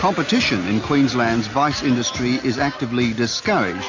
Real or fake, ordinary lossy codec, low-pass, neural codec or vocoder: real; AAC, 48 kbps; 7.2 kHz; none